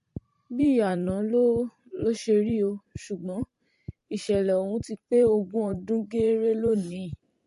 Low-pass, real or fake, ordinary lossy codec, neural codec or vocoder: 14.4 kHz; fake; MP3, 48 kbps; vocoder, 44.1 kHz, 128 mel bands every 512 samples, BigVGAN v2